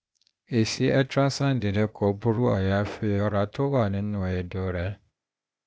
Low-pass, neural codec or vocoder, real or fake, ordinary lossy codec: none; codec, 16 kHz, 0.8 kbps, ZipCodec; fake; none